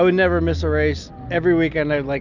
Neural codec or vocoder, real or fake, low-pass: none; real; 7.2 kHz